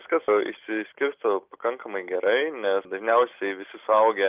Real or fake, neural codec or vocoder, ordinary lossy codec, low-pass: real; none; Opus, 32 kbps; 3.6 kHz